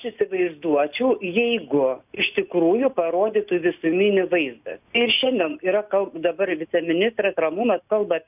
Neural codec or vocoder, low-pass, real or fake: none; 3.6 kHz; real